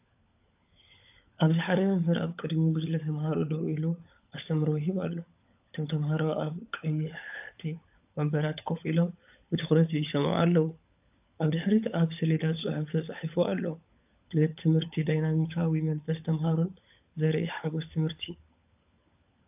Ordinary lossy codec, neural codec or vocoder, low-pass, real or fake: AAC, 32 kbps; codec, 16 kHz, 16 kbps, FunCodec, trained on LibriTTS, 50 frames a second; 3.6 kHz; fake